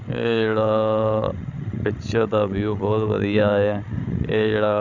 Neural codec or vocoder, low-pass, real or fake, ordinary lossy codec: codec, 16 kHz, 16 kbps, FreqCodec, larger model; 7.2 kHz; fake; none